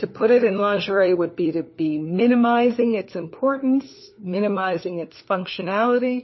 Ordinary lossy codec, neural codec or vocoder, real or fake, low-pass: MP3, 24 kbps; codec, 16 kHz, 4 kbps, FunCodec, trained on LibriTTS, 50 frames a second; fake; 7.2 kHz